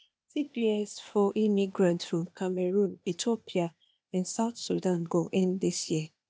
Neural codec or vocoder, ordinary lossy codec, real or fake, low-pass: codec, 16 kHz, 0.8 kbps, ZipCodec; none; fake; none